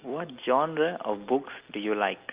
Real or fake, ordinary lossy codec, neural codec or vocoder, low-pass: real; Opus, 32 kbps; none; 3.6 kHz